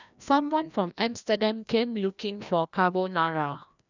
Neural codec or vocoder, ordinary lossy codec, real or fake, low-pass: codec, 16 kHz, 1 kbps, FreqCodec, larger model; none; fake; 7.2 kHz